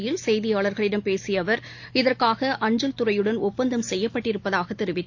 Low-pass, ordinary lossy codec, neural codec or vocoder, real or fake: 7.2 kHz; AAC, 48 kbps; none; real